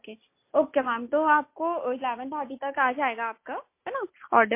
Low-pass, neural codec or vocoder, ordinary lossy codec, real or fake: 3.6 kHz; none; MP3, 24 kbps; real